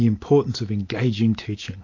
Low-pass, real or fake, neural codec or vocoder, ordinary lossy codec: 7.2 kHz; real; none; AAC, 48 kbps